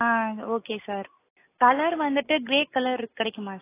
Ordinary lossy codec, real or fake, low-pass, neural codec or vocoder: AAC, 24 kbps; real; 3.6 kHz; none